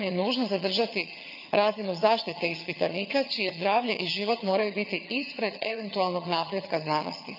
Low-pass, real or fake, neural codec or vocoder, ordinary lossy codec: 5.4 kHz; fake; vocoder, 22.05 kHz, 80 mel bands, HiFi-GAN; none